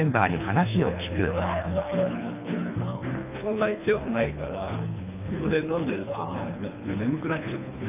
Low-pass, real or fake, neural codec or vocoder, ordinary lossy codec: 3.6 kHz; fake; codec, 24 kHz, 3 kbps, HILCodec; none